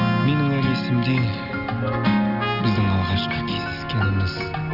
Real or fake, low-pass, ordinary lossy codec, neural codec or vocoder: real; 5.4 kHz; none; none